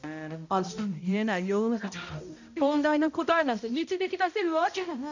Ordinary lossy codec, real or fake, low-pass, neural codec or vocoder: none; fake; 7.2 kHz; codec, 16 kHz, 0.5 kbps, X-Codec, HuBERT features, trained on balanced general audio